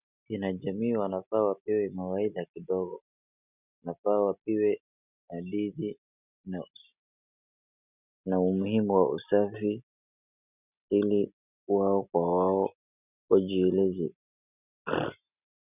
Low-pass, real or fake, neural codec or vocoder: 3.6 kHz; real; none